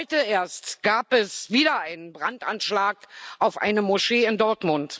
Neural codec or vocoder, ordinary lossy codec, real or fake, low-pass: none; none; real; none